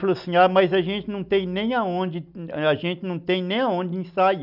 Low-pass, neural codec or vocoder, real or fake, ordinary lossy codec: 5.4 kHz; none; real; none